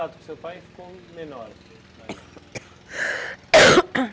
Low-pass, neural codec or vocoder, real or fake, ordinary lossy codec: none; none; real; none